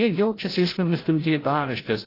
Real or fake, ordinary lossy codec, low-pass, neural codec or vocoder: fake; AAC, 24 kbps; 5.4 kHz; codec, 16 kHz, 0.5 kbps, FreqCodec, larger model